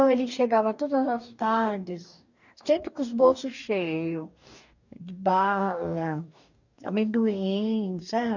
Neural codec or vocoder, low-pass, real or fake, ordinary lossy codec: codec, 44.1 kHz, 2.6 kbps, DAC; 7.2 kHz; fake; none